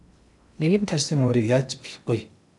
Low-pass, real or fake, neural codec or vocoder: 10.8 kHz; fake; codec, 16 kHz in and 24 kHz out, 0.6 kbps, FocalCodec, streaming, 2048 codes